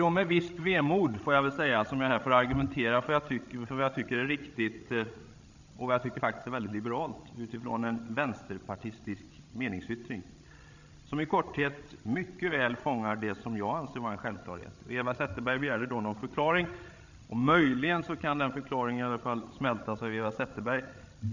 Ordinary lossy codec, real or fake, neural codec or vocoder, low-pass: none; fake; codec, 16 kHz, 16 kbps, FreqCodec, larger model; 7.2 kHz